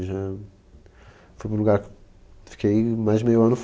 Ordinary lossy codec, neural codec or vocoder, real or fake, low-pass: none; none; real; none